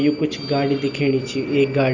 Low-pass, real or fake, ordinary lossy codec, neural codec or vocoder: 7.2 kHz; real; none; none